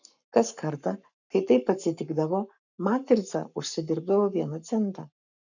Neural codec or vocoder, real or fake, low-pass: codec, 44.1 kHz, 7.8 kbps, Pupu-Codec; fake; 7.2 kHz